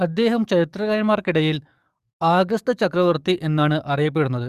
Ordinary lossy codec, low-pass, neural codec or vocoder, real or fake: Opus, 64 kbps; 14.4 kHz; codec, 44.1 kHz, 7.8 kbps, DAC; fake